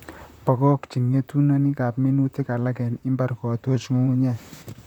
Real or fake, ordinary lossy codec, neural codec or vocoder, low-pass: fake; none; vocoder, 44.1 kHz, 128 mel bands, Pupu-Vocoder; 19.8 kHz